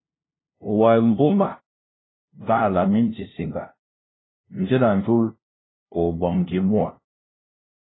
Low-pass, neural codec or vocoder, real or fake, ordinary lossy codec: 7.2 kHz; codec, 16 kHz, 0.5 kbps, FunCodec, trained on LibriTTS, 25 frames a second; fake; AAC, 16 kbps